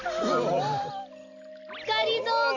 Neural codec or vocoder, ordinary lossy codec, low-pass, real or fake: none; MP3, 48 kbps; 7.2 kHz; real